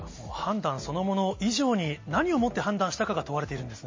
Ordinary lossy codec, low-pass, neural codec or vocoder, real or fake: MP3, 32 kbps; 7.2 kHz; none; real